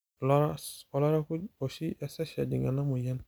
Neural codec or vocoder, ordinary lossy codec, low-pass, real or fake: none; none; none; real